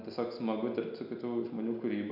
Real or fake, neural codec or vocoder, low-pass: real; none; 5.4 kHz